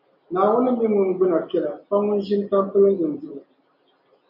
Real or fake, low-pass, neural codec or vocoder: real; 5.4 kHz; none